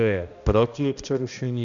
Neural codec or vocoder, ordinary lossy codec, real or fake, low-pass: codec, 16 kHz, 1 kbps, X-Codec, HuBERT features, trained on balanced general audio; MP3, 64 kbps; fake; 7.2 kHz